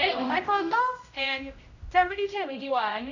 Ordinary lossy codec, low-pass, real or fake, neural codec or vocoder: none; 7.2 kHz; fake; codec, 16 kHz, 0.5 kbps, X-Codec, HuBERT features, trained on general audio